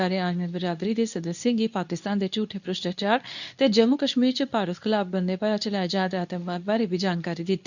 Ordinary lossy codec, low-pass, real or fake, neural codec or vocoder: none; 7.2 kHz; fake; codec, 24 kHz, 0.9 kbps, WavTokenizer, medium speech release version 2